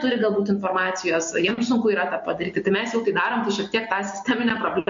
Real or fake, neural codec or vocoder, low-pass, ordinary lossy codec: real; none; 7.2 kHz; MP3, 48 kbps